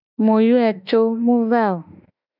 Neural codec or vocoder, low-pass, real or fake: autoencoder, 48 kHz, 32 numbers a frame, DAC-VAE, trained on Japanese speech; 5.4 kHz; fake